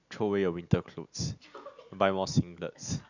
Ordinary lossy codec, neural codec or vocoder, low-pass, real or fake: none; none; 7.2 kHz; real